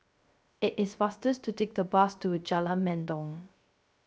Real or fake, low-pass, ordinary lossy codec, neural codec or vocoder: fake; none; none; codec, 16 kHz, 0.3 kbps, FocalCodec